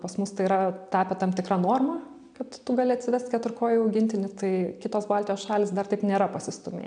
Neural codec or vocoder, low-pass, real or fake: none; 9.9 kHz; real